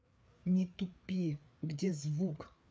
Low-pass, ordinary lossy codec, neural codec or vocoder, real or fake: none; none; codec, 16 kHz, 4 kbps, FreqCodec, larger model; fake